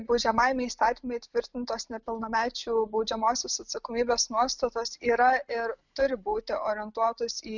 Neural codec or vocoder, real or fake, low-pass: none; real; 7.2 kHz